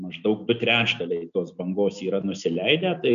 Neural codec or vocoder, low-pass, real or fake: none; 7.2 kHz; real